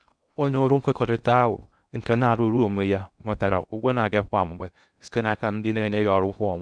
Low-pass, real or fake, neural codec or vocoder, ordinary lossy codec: 9.9 kHz; fake; codec, 16 kHz in and 24 kHz out, 0.6 kbps, FocalCodec, streaming, 2048 codes; none